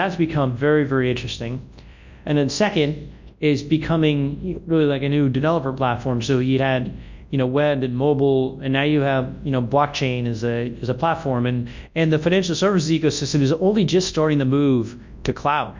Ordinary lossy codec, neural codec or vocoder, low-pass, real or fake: MP3, 64 kbps; codec, 24 kHz, 0.9 kbps, WavTokenizer, large speech release; 7.2 kHz; fake